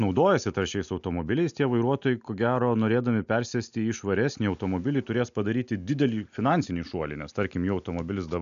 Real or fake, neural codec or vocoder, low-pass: real; none; 7.2 kHz